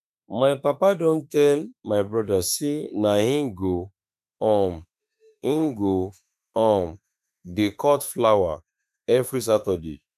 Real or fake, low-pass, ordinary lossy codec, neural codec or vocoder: fake; 14.4 kHz; none; autoencoder, 48 kHz, 32 numbers a frame, DAC-VAE, trained on Japanese speech